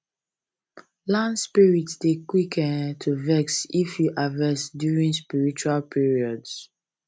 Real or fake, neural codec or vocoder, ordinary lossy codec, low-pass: real; none; none; none